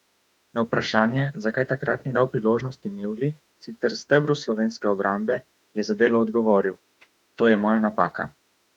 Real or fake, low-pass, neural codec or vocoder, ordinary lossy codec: fake; 19.8 kHz; autoencoder, 48 kHz, 32 numbers a frame, DAC-VAE, trained on Japanese speech; none